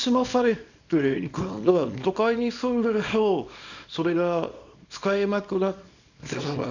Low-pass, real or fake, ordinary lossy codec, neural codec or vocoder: 7.2 kHz; fake; none; codec, 24 kHz, 0.9 kbps, WavTokenizer, small release